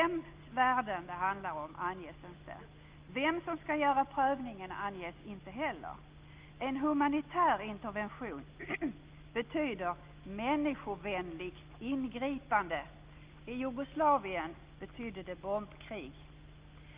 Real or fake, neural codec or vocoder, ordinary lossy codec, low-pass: real; none; Opus, 32 kbps; 3.6 kHz